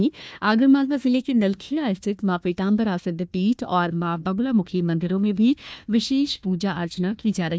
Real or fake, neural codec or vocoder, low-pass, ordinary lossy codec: fake; codec, 16 kHz, 1 kbps, FunCodec, trained on Chinese and English, 50 frames a second; none; none